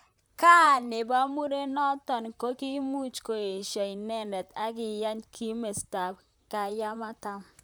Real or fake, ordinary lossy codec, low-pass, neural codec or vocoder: fake; none; none; vocoder, 44.1 kHz, 128 mel bands, Pupu-Vocoder